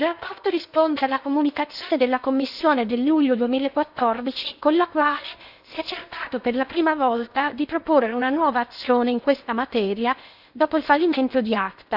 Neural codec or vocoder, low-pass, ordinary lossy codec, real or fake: codec, 16 kHz in and 24 kHz out, 0.8 kbps, FocalCodec, streaming, 65536 codes; 5.4 kHz; none; fake